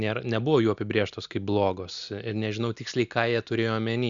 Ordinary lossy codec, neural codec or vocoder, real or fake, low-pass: Opus, 64 kbps; none; real; 7.2 kHz